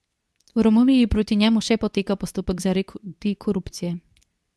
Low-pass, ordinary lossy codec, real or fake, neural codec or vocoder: none; none; fake; codec, 24 kHz, 0.9 kbps, WavTokenizer, medium speech release version 2